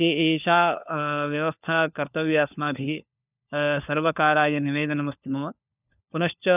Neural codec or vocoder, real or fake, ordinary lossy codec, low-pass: codec, 16 kHz, 4 kbps, FunCodec, trained on LibriTTS, 50 frames a second; fake; none; 3.6 kHz